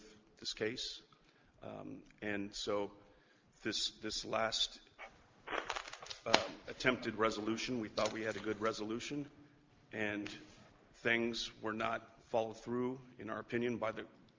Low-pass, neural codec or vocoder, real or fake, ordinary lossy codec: 7.2 kHz; none; real; Opus, 24 kbps